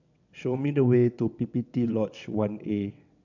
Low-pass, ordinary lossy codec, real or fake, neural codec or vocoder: 7.2 kHz; none; fake; vocoder, 22.05 kHz, 80 mel bands, WaveNeXt